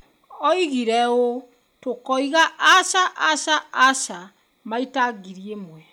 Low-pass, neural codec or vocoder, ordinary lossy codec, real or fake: none; none; none; real